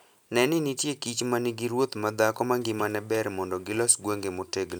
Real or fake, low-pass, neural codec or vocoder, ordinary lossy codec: real; none; none; none